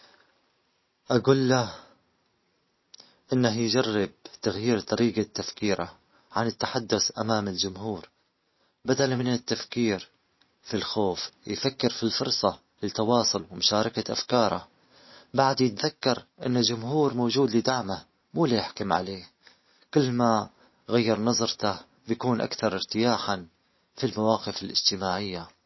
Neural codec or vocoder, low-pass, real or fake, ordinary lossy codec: none; 7.2 kHz; real; MP3, 24 kbps